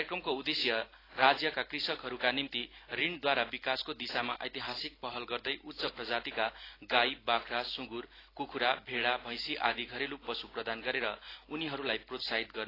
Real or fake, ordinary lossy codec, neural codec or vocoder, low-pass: real; AAC, 24 kbps; none; 5.4 kHz